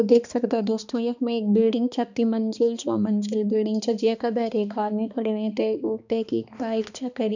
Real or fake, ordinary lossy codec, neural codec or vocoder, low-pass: fake; none; codec, 16 kHz, 2 kbps, X-Codec, HuBERT features, trained on balanced general audio; 7.2 kHz